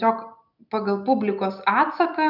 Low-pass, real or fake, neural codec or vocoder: 5.4 kHz; real; none